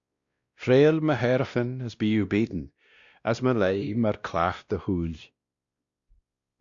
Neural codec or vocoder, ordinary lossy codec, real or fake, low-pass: codec, 16 kHz, 1 kbps, X-Codec, WavLM features, trained on Multilingual LibriSpeech; Opus, 64 kbps; fake; 7.2 kHz